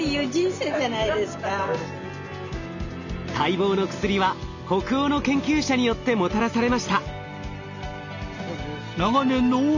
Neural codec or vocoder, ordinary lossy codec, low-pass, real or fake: none; none; 7.2 kHz; real